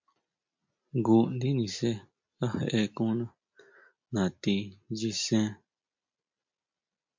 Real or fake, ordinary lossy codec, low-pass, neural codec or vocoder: real; MP3, 48 kbps; 7.2 kHz; none